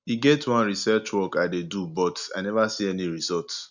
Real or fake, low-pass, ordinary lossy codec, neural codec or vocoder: real; 7.2 kHz; none; none